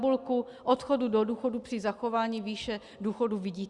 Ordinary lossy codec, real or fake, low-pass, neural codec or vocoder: Opus, 64 kbps; real; 10.8 kHz; none